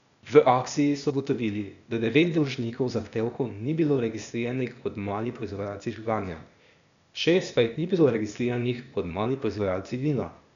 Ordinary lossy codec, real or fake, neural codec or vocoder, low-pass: none; fake; codec, 16 kHz, 0.8 kbps, ZipCodec; 7.2 kHz